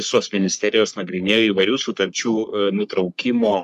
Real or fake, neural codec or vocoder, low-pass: fake; codec, 44.1 kHz, 3.4 kbps, Pupu-Codec; 14.4 kHz